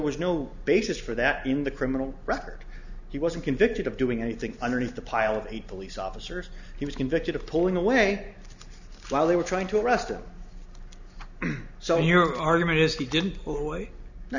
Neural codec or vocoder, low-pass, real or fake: none; 7.2 kHz; real